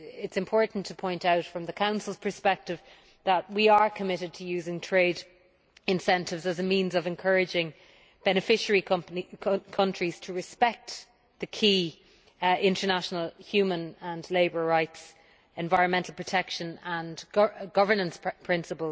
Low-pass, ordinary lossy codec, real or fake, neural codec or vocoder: none; none; real; none